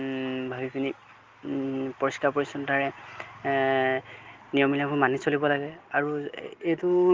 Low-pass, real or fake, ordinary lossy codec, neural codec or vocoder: 7.2 kHz; real; Opus, 32 kbps; none